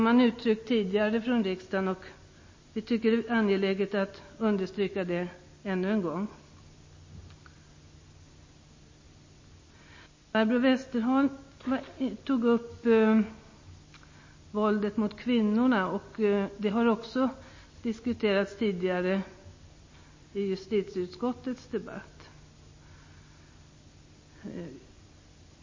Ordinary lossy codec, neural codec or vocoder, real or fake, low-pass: MP3, 32 kbps; none; real; 7.2 kHz